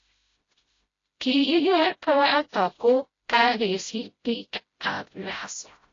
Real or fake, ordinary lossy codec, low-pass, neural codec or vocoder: fake; AAC, 32 kbps; 7.2 kHz; codec, 16 kHz, 0.5 kbps, FreqCodec, smaller model